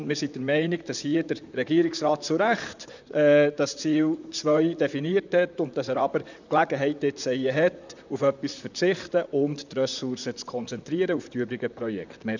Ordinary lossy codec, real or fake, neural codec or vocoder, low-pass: none; fake; vocoder, 44.1 kHz, 128 mel bands, Pupu-Vocoder; 7.2 kHz